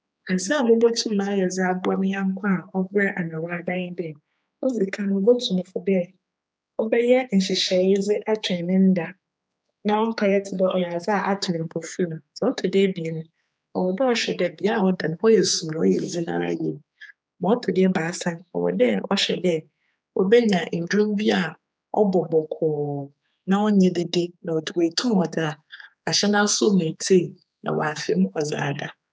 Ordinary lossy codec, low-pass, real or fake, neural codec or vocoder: none; none; fake; codec, 16 kHz, 4 kbps, X-Codec, HuBERT features, trained on general audio